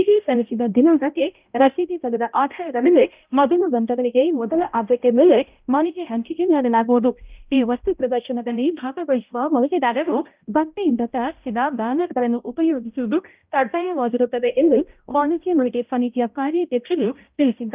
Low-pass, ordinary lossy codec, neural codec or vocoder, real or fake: 3.6 kHz; Opus, 24 kbps; codec, 16 kHz, 0.5 kbps, X-Codec, HuBERT features, trained on balanced general audio; fake